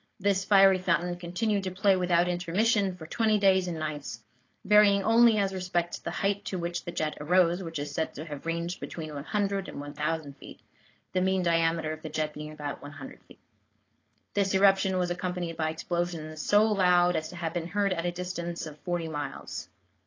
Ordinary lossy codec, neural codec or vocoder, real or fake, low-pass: AAC, 32 kbps; codec, 16 kHz, 4.8 kbps, FACodec; fake; 7.2 kHz